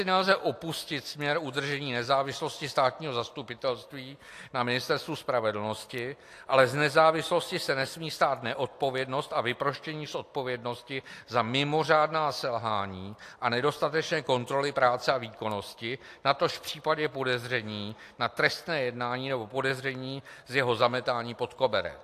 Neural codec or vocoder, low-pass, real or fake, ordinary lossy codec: none; 14.4 kHz; real; AAC, 64 kbps